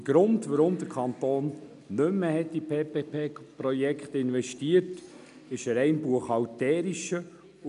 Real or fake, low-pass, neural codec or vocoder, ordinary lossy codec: real; 10.8 kHz; none; none